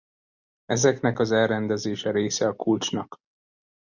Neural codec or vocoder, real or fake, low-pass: none; real; 7.2 kHz